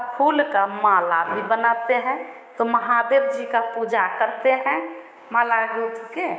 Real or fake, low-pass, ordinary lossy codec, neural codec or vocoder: fake; none; none; codec, 16 kHz, 6 kbps, DAC